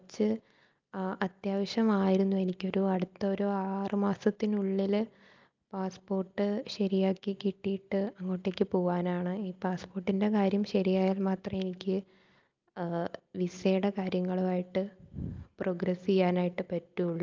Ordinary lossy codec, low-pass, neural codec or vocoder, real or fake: Opus, 24 kbps; 7.2 kHz; none; real